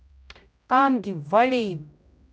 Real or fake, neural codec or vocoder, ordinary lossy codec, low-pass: fake; codec, 16 kHz, 0.5 kbps, X-Codec, HuBERT features, trained on general audio; none; none